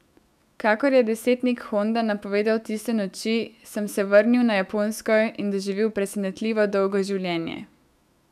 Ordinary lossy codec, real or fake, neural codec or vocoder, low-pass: none; fake; autoencoder, 48 kHz, 128 numbers a frame, DAC-VAE, trained on Japanese speech; 14.4 kHz